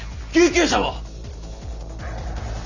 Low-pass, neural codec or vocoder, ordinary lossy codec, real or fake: 7.2 kHz; none; none; real